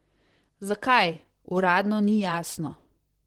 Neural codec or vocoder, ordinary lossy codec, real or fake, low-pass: vocoder, 44.1 kHz, 128 mel bands, Pupu-Vocoder; Opus, 16 kbps; fake; 19.8 kHz